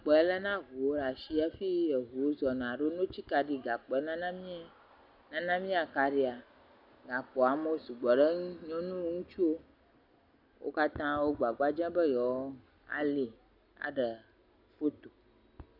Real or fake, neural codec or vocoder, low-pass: real; none; 5.4 kHz